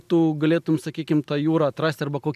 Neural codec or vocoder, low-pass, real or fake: none; 14.4 kHz; real